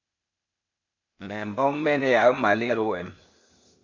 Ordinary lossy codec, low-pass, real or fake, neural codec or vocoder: MP3, 64 kbps; 7.2 kHz; fake; codec, 16 kHz, 0.8 kbps, ZipCodec